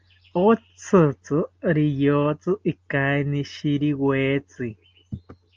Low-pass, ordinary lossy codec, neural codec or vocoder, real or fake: 7.2 kHz; Opus, 24 kbps; none; real